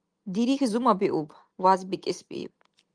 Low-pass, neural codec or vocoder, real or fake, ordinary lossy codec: 9.9 kHz; none; real; Opus, 32 kbps